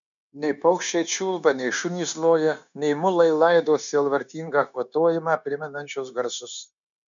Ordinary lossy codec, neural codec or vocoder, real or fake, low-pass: MP3, 96 kbps; codec, 16 kHz, 0.9 kbps, LongCat-Audio-Codec; fake; 7.2 kHz